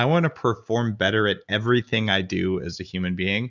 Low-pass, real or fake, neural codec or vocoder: 7.2 kHz; real; none